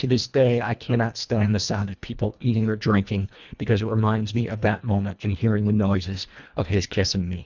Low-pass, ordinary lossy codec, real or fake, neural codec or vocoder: 7.2 kHz; Opus, 64 kbps; fake; codec, 24 kHz, 1.5 kbps, HILCodec